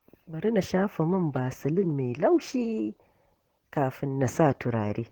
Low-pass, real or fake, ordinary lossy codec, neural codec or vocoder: 19.8 kHz; fake; Opus, 16 kbps; vocoder, 44.1 kHz, 128 mel bands, Pupu-Vocoder